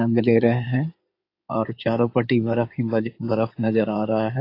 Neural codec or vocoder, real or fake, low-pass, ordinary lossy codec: codec, 16 kHz in and 24 kHz out, 2.2 kbps, FireRedTTS-2 codec; fake; 5.4 kHz; AAC, 32 kbps